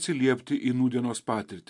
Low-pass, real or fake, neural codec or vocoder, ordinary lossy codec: 10.8 kHz; real; none; MP3, 64 kbps